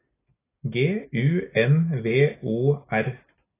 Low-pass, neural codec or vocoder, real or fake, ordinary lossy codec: 3.6 kHz; none; real; AAC, 16 kbps